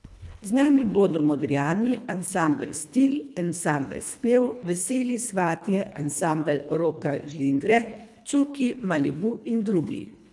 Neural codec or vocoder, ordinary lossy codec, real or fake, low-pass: codec, 24 kHz, 1.5 kbps, HILCodec; none; fake; none